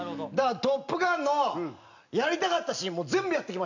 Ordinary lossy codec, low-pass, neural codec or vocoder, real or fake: none; 7.2 kHz; none; real